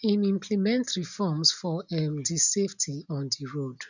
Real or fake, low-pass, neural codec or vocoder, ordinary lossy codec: real; 7.2 kHz; none; none